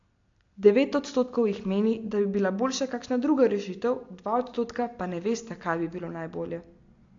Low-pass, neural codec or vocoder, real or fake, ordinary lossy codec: 7.2 kHz; none; real; AAC, 48 kbps